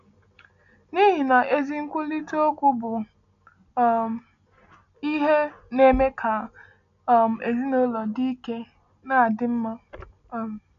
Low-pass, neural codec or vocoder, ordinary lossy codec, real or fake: 7.2 kHz; none; none; real